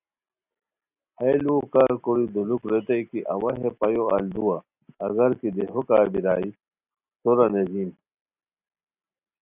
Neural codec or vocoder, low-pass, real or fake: none; 3.6 kHz; real